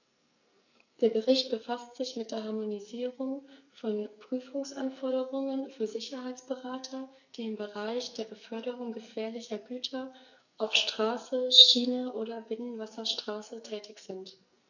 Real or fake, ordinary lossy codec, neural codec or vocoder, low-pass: fake; none; codec, 44.1 kHz, 2.6 kbps, SNAC; 7.2 kHz